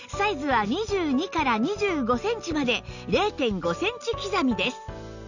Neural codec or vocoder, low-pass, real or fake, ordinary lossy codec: none; 7.2 kHz; real; none